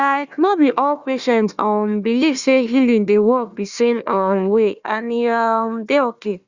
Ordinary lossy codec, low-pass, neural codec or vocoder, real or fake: Opus, 64 kbps; 7.2 kHz; codec, 16 kHz, 1 kbps, FunCodec, trained on Chinese and English, 50 frames a second; fake